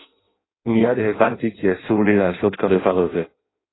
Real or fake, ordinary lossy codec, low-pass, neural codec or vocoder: fake; AAC, 16 kbps; 7.2 kHz; codec, 16 kHz in and 24 kHz out, 0.6 kbps, FireRedTTS-2 codec